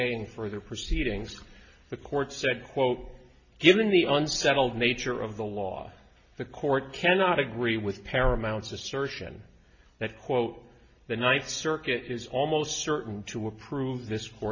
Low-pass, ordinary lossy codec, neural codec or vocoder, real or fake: 7.2 kHz; MP3, 32 kbps; none; real